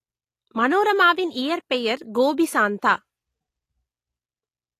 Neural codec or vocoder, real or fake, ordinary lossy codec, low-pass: none; real; AAC, 48 kbps; 14.4 kHz